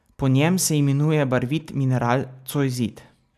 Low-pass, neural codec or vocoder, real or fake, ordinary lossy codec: 14.4 kHz; none; real; none